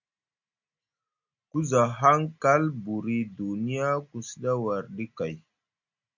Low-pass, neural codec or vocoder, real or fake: 7.2 kHz; none; real